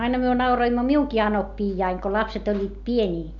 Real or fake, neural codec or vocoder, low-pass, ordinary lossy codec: real; none; 7.2 kHz; none